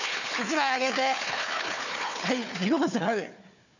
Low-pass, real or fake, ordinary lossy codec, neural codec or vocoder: 7.2 kHz; fake; none; codec, 16 kHz, 4 kbps, FunCodec, trained on Chinese and English, 50 frames a second